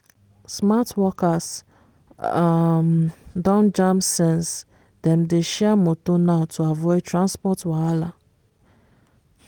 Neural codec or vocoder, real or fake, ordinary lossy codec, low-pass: none; real; Opus, 24 kbps; 19.8 kHz